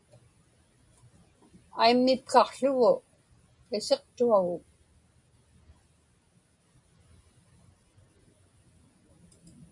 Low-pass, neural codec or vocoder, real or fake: 10.8 kHz; none; real